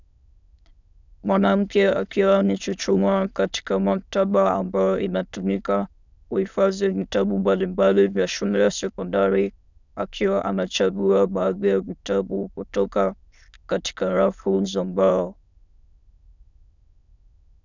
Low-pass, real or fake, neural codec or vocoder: 7.2 kHz; fake; autoencoder, 22.05 kHz, a latent of 192 numbers a frame, VITS, trained on many speakers